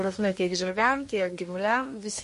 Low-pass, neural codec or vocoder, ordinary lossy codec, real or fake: 10.8 kHz; codec, 24 kHz, 1 kbps, SNAC; MP3, 48 kbps; fake